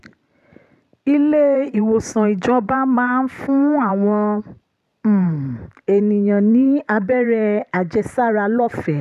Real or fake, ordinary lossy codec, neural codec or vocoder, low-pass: fake; none; vocoder, 44.1 kHz, 128 mel bands every 512 samples, BigVGAN v2; 14.4 kHz